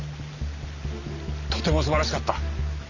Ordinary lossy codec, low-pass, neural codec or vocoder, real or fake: none; 7.2 kHz; none; real